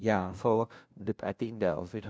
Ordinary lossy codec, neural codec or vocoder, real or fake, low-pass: none; codec, 16 kHz, 0.5 kbps, FunCodec, trained on LibriTTS, 25 frames a second; fake; none